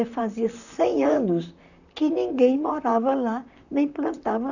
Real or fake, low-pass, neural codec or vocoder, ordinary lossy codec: fake; 7.2 kHz; vocoder, 44.1 kHz, 128 mel bands, Pupu-Vocoder; none